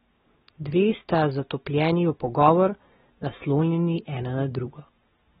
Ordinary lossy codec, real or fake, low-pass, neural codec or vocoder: AAC, 16 kbps; real; 19.8 kHz; none